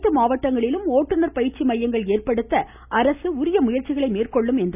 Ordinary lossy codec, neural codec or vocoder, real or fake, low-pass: none; none; real; 3.6 kHz